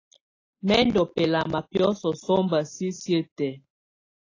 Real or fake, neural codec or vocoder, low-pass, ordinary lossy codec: real; none; 7.2 kHz; AAC, 32 kbps